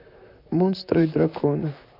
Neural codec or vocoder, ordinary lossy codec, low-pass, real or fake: vocoder, 44.1 kHz, 128 mel bands, Pupu-Vocoder; none; 5.4 kHz; fake